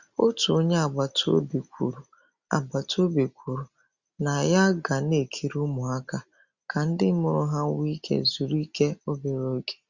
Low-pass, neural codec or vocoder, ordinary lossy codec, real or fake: 7.2 kHz; none; Opus, 64 kbps; real